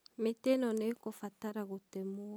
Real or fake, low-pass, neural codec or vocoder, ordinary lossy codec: real; none; none; none